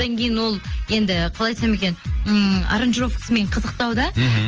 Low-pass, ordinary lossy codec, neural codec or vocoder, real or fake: 7.2 kHz; Opus, 24 kbps; none; real